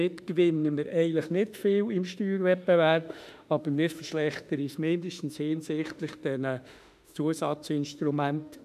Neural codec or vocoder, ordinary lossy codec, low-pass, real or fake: autoencoder, 48 kHz, 32 numbers a frame, DAC-VAE, trained on Japanese speech; none; 14.4 kHz; fake